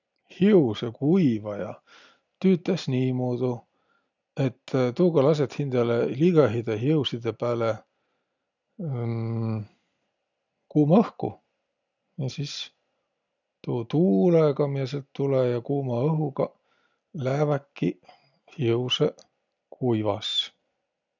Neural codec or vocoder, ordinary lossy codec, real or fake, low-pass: none; none; real; 7.2 kHz